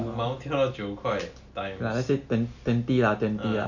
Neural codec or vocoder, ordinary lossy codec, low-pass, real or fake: none; none; 7.2 kHz; real